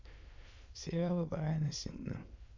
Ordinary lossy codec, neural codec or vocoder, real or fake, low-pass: AAC, 48 kbps; autoencoder, 22.05 kHz, a latent of 192 numbers a frame, VITS, trained on many speakers; fake; 7.2 kHz